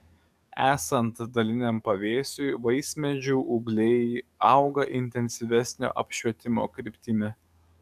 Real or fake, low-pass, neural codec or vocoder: fake; 14.4 kHz; codec, 44.1 kHz, 7.8 kbps, DAC